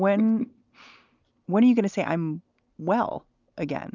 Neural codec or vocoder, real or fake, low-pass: none; real; 7.2 kHz